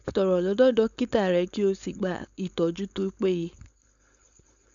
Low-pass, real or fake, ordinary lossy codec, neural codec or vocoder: 7.2 kHz; fake; none; codec, 16 kHz, 4.8 kbps, FACodec